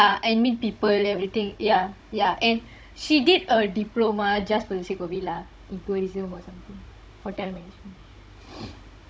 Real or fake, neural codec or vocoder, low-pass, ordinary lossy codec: fake; codec, 16 kHz, 16 kbps, FunCodec, trained on Chinese and English, 50 frames a second; none; none